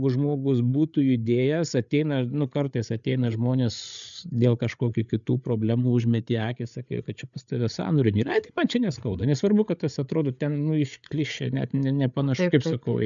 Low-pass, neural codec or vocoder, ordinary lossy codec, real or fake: 7.2 kHz; codec, 16 kHz, 8 kbps, FreqCodec, larger model; MP3, 96 kbps; fake